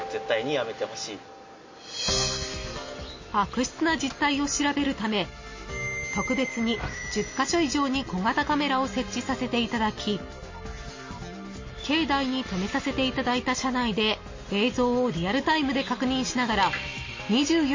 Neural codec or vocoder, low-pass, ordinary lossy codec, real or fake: none; 7.2 kHz; MP3, 32 kbps; real